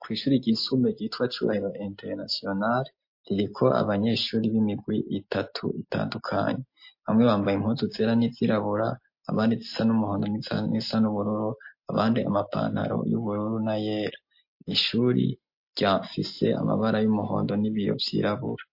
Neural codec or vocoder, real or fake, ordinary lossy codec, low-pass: none; real; MP3, 32 kbps; 5.4 kHz